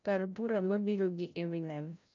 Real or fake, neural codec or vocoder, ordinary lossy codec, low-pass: fake; codec, 16 kHz, 0.5 kbps, FreqCodec, larger model; none; 7.2 kHz